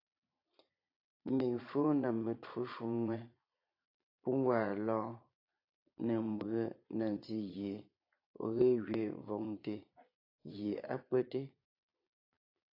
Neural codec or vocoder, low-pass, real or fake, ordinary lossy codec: vocoder, 22.05 kHz, 80 mel bands, Vocos; 5.4 kHz; fake; AAC, 32 kbps